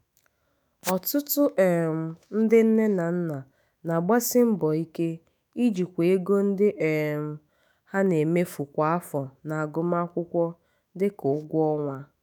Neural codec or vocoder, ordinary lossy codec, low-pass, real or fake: autoencoder, 48 kHz, 128 numbers a frame, DAC-VAE, trained on Japanese speech; none; none; fake